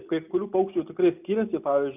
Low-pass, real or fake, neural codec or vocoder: 3.6 kHz; real; none